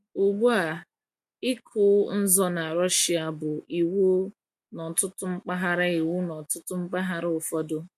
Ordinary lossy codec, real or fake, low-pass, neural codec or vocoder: MP3, 64 kbps; real; 14.4 kHz; none